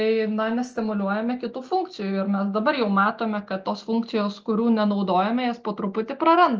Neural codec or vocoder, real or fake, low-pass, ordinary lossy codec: none; real; 7.2 kHz; Opus, 24 kbps